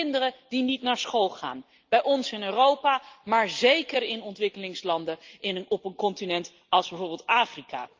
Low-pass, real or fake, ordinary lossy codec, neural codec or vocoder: 7.2 kHz; real; Opus, 32 kbps; none